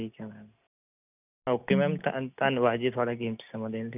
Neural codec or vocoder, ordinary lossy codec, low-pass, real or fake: none; none; 3.6 kHz; real